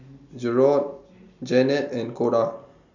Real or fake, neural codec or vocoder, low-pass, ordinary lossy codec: real; none; 7.2 kHz; none